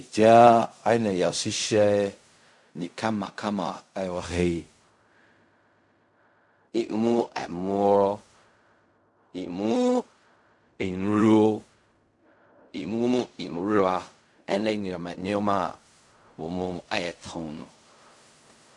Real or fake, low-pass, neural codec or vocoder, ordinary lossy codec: fake; 10.8 kHz; codec, 16 kHz in and 24 kHz out, 0.4 kbps, LongCat-Audio-Codec, fine tuned four codebook decoder; MP3, 64 kbps